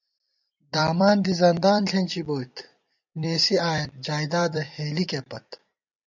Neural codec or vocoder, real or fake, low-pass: vocoder, 44.1 kHz, 128 mel bands every 256 samples, BigVGAN v2; fake; 7.2 kHz